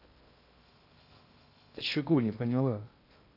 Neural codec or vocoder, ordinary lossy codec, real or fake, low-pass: codec, 16 kHz in and 24 kHz out, 0.6 kbps, FocalCodec, streaming, 2048 codes; AAC, 32 kbps; fake; 5.4 kHz